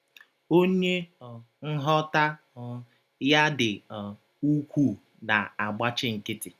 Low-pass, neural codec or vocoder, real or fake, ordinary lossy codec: 14.4 kHz; none; real; none